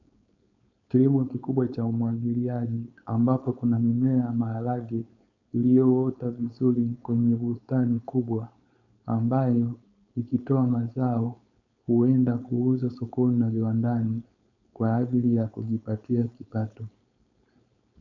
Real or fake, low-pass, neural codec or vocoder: fake; 7.2 kHz; codec, 16 kHz, 4.8 kbps, FACodec